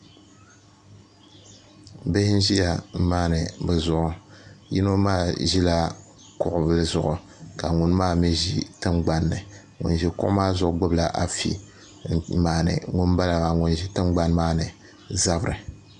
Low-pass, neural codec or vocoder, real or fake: 9.9 kHz; none; real